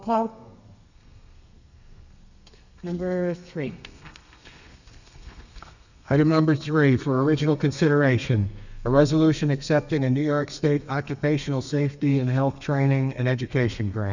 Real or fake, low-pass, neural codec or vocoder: fake; 7.2 kHz; codec, 32 kHz, 1.9 kbps, SNAC